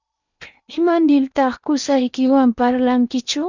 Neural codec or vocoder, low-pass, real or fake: codec, 16 kHz in and 24 kHz out, 0.8 kbps, FocalCodec, streaming, 65536 codes; 7.2 kHz; fake